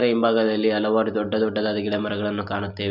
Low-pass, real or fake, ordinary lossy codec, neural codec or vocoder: 5.4 kHz; real; none; none